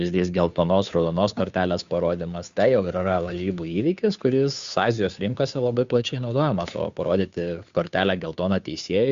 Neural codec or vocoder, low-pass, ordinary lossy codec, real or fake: codec, 16 kHz, 2 kbps, FunCodec, trained on Chinese and English, 25 frames a second; 7.2 kHz; Opus, 64 kbps; fake